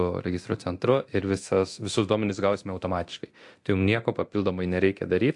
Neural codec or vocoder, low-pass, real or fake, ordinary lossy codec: codec, 24 kHz, 0.9 kbps, DualCodec; 10.8 kHz; fake; AAC, 64 kbps